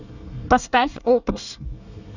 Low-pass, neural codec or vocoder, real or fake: 7.2 kHz; codec, 24 kHz, 1 kbps, SNAC; fake